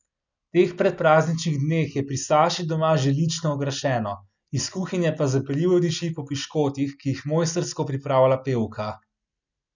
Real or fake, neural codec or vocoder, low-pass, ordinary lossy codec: real; none; 7.2 kHz; none